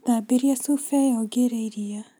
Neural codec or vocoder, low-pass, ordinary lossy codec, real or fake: none; none; none; real